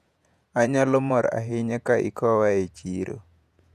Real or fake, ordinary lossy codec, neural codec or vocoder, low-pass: fake; none; vocoder, 48 kHz, 128 mel bands, Vocos; 14.4 kHz